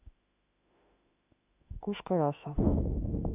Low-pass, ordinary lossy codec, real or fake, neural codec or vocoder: 3.6 kHz; AAC, 32 kbps; fake; autoencoder, 48 kHz, 32 numbers a frame, DAC-VAE, trained on Japanese speech